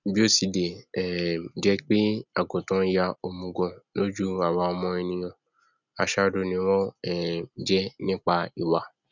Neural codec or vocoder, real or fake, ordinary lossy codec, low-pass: none; real; none; 7.2 kHz